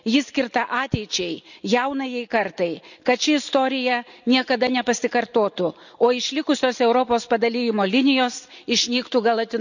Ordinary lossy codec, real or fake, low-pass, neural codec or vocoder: none; real; 7.2 kHz; none